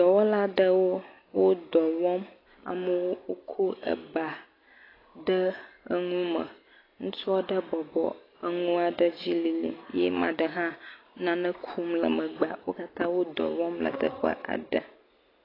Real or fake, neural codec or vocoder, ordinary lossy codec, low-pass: real; none; AAC, 24 kbps; 5.4 kHz